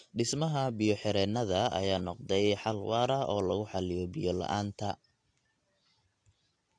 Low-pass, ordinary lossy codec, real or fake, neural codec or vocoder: 9.9 kHz; AAC, 48 kbps; real; none